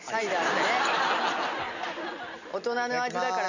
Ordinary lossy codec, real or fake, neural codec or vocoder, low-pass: none; real; none; 7.2 kHz